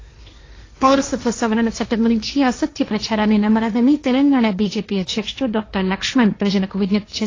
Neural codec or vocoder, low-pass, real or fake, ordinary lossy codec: codec, 16 kHz, 1.1 kbps, Voila-Tokenizer; 7.2 kHz; fake; AAC, 32 kbps